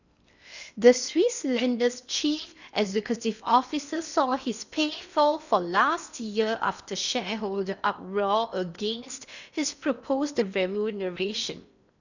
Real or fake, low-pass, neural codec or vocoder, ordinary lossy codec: fake; 7.2 kHz; codec, 16 kHz in and 24 kHz out, 0.8 kbps, FocalCodec, streaming, 65536 codes; none